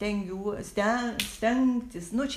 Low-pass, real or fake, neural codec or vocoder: 14.4 kHz; real; none